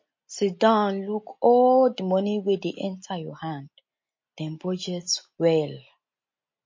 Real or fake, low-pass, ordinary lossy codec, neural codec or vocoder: real; 7.2 kHz; MP3, 32 kbps; none